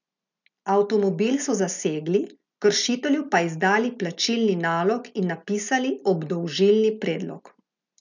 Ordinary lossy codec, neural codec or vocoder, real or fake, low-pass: none; none; real; 7.2 kHz